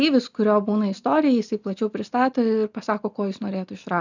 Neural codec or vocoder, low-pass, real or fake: none; 7.2 kHz; real